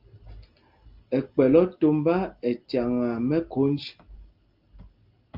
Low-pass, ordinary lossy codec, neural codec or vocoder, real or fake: 5.4 kHz; Opus, 32 kbps; none; real